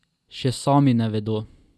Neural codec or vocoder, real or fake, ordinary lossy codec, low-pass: none; real; none; none